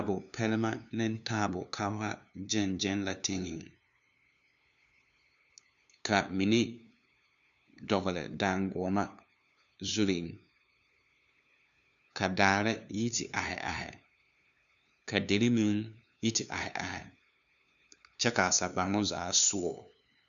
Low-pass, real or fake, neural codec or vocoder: 7.2 kHz; fake; codec, 16 kHz, 2 kbps, FunCodec, trained on LibriTTS, 25 frames a second